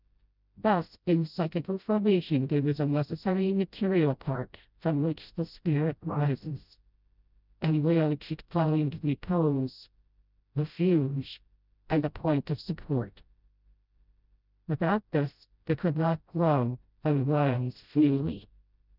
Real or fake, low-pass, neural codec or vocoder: fake; 5.4 kHz; codec, 16 kHz, 0.5 kbps, FreqCodec, smaller model